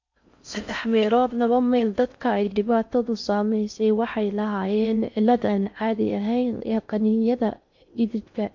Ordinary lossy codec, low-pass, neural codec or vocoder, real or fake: MP3, 64 kbps; 7.2 kHz; codec, 16 kHz in and 24 kHz out, 0.6 kbps, FocalCodec, streaming, 4096 codes; fake